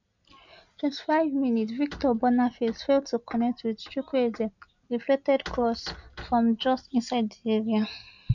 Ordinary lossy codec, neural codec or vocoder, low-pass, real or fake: none; none; 7.2 kHz; real